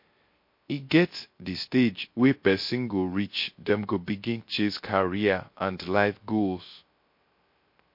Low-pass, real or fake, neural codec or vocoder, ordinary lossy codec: 5.4 kHz; fake; codec, 16 kHz, 0.3 kbps, FocalCodec; MP3, 32 kbps